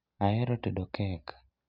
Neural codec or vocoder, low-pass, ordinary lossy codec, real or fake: none; 5.4 kHz; none; real